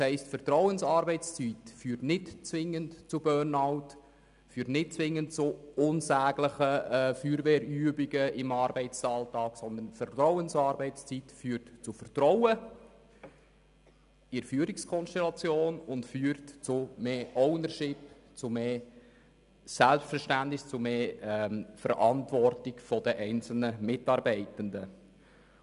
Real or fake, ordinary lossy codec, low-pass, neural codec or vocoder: real; none; 10.8 kHz; none